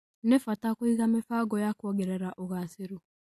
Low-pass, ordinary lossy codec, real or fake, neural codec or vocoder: 14.4 kHz; AAC, 64 kbps; real; none